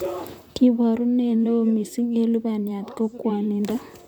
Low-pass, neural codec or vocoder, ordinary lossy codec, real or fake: 19.8 kHz; vocoder, 44.1 kHz, 128 mel bands, Pupu-Vocoder; none; fake